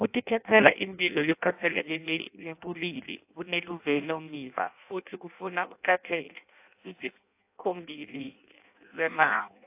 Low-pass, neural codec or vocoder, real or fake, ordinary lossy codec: 3.6 kHz; codec, 16 kHz in and 24 kHz out, 0.6 kbps, FireRedTTS-2 codec; fake; AAC, 32 kbps